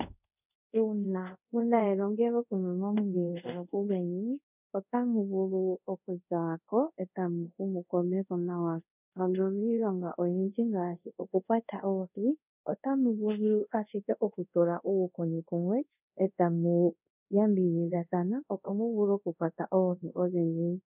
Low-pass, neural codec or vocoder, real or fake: 3.6 kHz; codec, 24 kHz, 0.5 kbps, DualCodec; fake